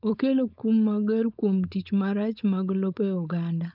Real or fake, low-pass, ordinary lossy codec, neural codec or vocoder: fake; 5.4 kHz; none; codec, 16 kHz, 4.8 kbps, FACodec